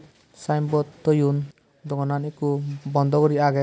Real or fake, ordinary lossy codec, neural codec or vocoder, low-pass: real; none; none; none